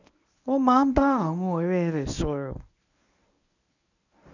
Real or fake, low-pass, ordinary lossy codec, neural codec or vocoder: fake; 7.2 kHz; none; codec, 24 kHz, 0.9 kbps, WavTokenizer, medium speech release version 1